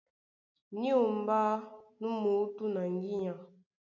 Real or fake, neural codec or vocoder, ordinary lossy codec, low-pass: real; none; MP3, 48 kbps; 7.2 kHz